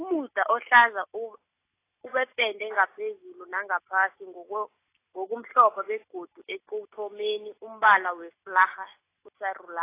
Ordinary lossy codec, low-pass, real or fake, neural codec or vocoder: AAC, 24 kbps; 3.6 kHz; real; none